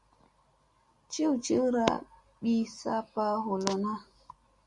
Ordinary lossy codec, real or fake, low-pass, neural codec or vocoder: Opus, 64 kbps; real; 10.8 kHz; none